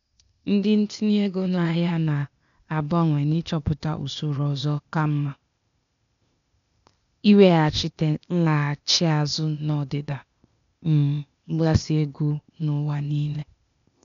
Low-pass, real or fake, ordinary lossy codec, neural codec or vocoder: 7.2 kHz; fake; none; codec, 16 kHz, 0.8 kbps, ZipCodec